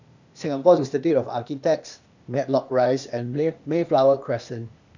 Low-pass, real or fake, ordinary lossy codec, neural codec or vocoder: 7.2 kHz; fake; none; codec, 16 kHz, 0.8 kbps, ZipCodec